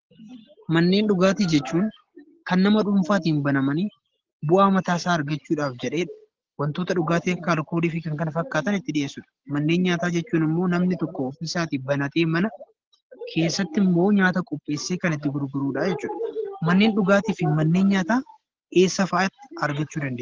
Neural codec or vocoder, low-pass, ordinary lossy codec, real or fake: none; 7.2 kHz; Opus, 16 kbps; real